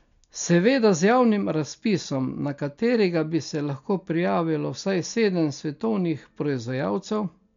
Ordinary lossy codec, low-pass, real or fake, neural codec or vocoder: MP3, 64 kbps; 7.2 kHz; real; none